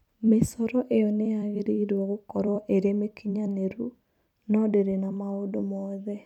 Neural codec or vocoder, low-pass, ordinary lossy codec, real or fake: vocoder, 44.1 kHz, 128 mel bands every 256 samples, BigVGAN v2; 19.8 kHz; none; fake